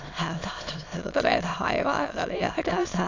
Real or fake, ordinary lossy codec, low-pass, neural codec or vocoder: fake; AAC, 48 kbps; 7.2 kHz; autoencoder, 22.05 kHz, a latent of 192 numbers a frame, VITS, trained on many speakers